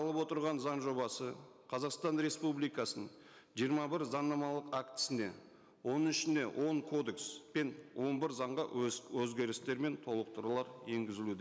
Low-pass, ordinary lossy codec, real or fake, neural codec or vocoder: none; none; real; none